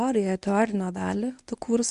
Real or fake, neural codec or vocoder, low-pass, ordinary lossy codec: fake; codec, 24 kHz, 0.9 kbps, WavTokenizer, medium speech release version 1; 10.8 kHz; Opus, 64 kbps